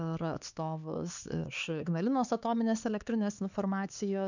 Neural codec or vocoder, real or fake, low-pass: codec, 16 kHz, 4 kbps, X-Codec, HuBERT features, trained on LibriSpeech; fake; 7.2 kHz